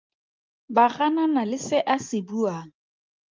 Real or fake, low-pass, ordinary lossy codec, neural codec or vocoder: real; 7.2 kHz; Opus, 24 kbps; none